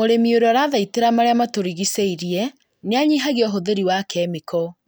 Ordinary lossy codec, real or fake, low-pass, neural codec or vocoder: none; real; none; none